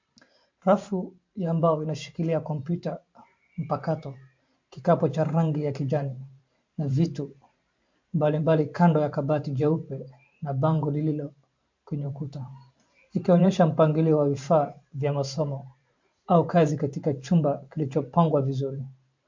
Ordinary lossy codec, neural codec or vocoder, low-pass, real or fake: MP3, 48 kbps; vocoder, 44.1 kHz, 128 mel bands every 512 samples, BigVGAN v2; 7.2 kHz; fake